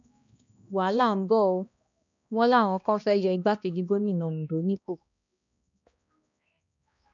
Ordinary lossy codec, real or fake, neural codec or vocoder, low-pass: MP3, 96 kbps; fake; codec, 16 kHz, 1 kbps, X-Codec, HuBERT features, trained on balanced general audio; 7.2 kHz